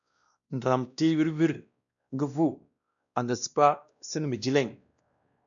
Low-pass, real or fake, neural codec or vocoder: 7.2 kHz; fake; codec, 16 kHz, 1 kbps, X-Codec, WavLM features, trained on Multilingual LibriSpeech